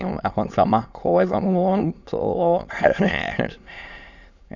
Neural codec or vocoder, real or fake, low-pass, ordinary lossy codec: autoencoder, 22.05 kHz, a latent of 192 numbers a frame, VITS, trained on many speakers; fake; 7.2 kHz; none